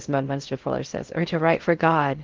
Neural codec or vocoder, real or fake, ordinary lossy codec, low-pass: codec, 16 kHz in and 24 kHz out, 0.6 kbps, FocalCodec, streaming, 4096 codes; fake; Opus, 16 kbps; 7.2 kHz